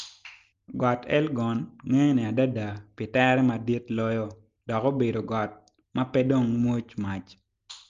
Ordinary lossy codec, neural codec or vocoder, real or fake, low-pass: Opus, 32 kbps; none; real; 7.2 kHz